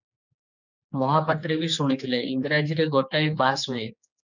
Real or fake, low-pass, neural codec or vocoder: fake; 7.2 kHz; codec, 16 kHz, 4 kbps, X-Codec, HuBERT features, trained on general audio